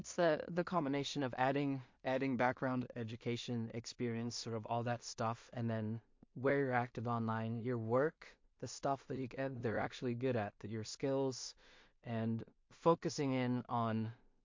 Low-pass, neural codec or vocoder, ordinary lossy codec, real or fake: 7.2 kHz; codec, 16 kHz in and 24 kHz out, 0.4 kbps, LongCat-Audio-Codec, two codebook decoder; MP3, 48 kbps; fake